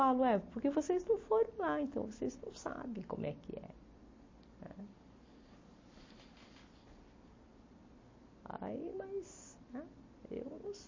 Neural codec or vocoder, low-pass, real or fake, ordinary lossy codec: none; 7.2 kHz; real; MP3, 32 kbps